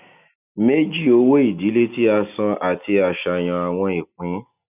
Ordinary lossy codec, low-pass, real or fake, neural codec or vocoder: none; 3.6 kHz; real; none